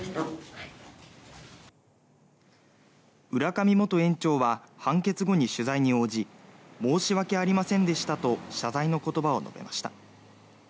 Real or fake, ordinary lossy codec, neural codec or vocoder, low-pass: real; none; none; none